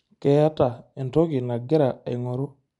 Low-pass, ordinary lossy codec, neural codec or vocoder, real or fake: 10.8 kHz; none; none; real